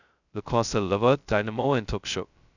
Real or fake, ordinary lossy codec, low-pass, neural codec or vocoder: fake; none; 7.2 kHz; codec, 16 kHz, 0.2 kbps, FocalCodec